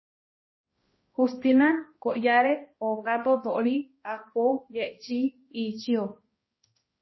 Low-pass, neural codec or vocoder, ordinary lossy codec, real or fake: 7.2 kHz; codec, 16 kHz, 1 kbps, X-Codec, HuBERT features, trained on balanced general audio; MP3, 24 kbps; fake